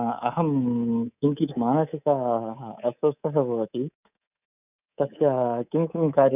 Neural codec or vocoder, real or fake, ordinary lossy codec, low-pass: codec, 24 kHz, 3.1 kbps, DualCodec; fake; none; 3.6 kHz